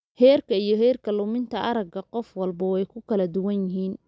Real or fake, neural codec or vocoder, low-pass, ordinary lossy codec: real; none; none; none